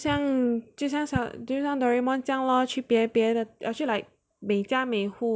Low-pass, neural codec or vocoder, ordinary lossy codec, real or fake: none; none; none; real